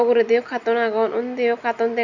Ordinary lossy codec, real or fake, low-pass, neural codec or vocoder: none; real; 7.2 kHz; none